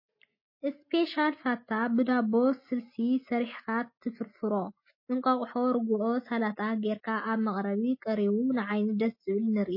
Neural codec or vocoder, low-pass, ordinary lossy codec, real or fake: none; 5.4 kHz; MP3, 24 kbps; real